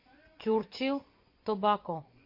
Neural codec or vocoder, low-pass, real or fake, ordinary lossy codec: vocoder, 24 kHz, 100 mel bands, Vocos; 5.4 kHz; fake; MP3, 32 kbps